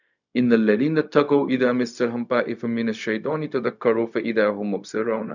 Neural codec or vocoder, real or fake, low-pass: codec, 16 kHz, 0.4 kbps, LongCat-Audio-Codec; fake; 7.2 kHz